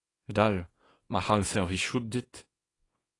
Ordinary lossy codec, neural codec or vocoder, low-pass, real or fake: AAC, 32 kbps; codec, 24 kHz, 0.9 kbps, WavTokenizer, small release; 10.8 kHz; fake